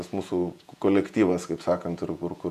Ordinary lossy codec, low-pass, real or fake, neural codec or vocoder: MP3, 96 kbps; 14.4 kHz; fake; vocoder, 48 kHz, 128 mel bands, Vocos